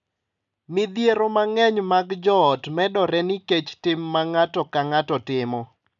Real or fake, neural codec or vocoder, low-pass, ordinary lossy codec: real; none; 7.2 kHz; none